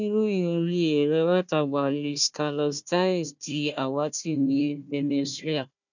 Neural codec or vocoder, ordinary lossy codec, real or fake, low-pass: codec, 16 kHz, 1 kbps, FunCodec, trained on Chinese and English, 50 frames a second; none; fake; 7.2 kHz